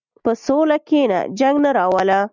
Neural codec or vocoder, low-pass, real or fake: none; 7.2 kHz; real